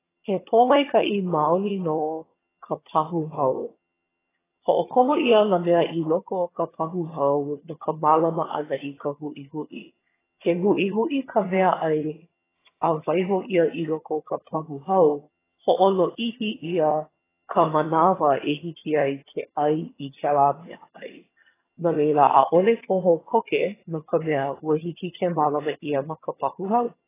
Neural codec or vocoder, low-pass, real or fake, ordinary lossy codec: vocoder, 22.05 kHz, 80 mel bands, HiFi-GAN; 3.6 kHz; fake; AAC, 16 kbps